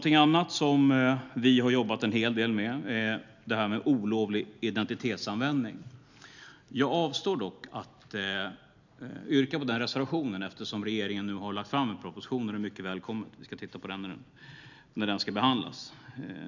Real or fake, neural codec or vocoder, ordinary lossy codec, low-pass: real; none; none; 7.2 kHz